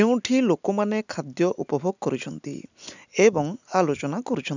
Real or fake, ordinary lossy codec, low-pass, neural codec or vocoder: real; none; 7.2 kHz; none